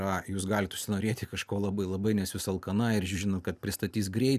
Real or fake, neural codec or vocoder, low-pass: real; none; 14.4 kHz